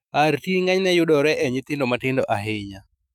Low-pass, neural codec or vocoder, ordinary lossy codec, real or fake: 19.8 kHz; autoencoder, 48 kHz, 128 numbers a frame, DAC-VAE, trained on Japanese speech; none; fake